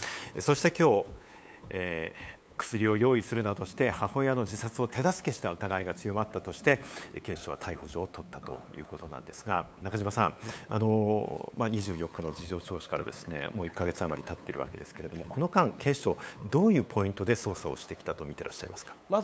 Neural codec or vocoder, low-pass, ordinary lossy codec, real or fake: codec, 16 kHz, 8 kbps, FunCodec, trained on LibriTTS, 25 frames a second; none; none; fake